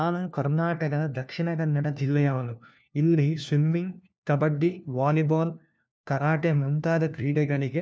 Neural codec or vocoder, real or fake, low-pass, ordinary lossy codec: codec, 16 kHz, 1 kbps, FunCodec, trained on LibriTTS, 50 frames a second; fake; none; none